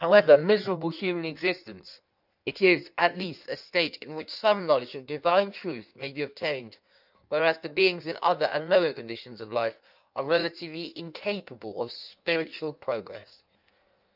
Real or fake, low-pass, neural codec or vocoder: fake; 5.4 kHz; codec, 16 kHz in and 24 kHz out, 1.1 kbps, FireRedTTS-2 codec